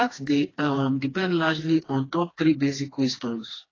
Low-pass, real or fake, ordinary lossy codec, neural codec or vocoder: 7.2 kHz; fake; AAC, 32 kbps; codec, 16 kHz, 2 kbps, FreqCodec, smaller model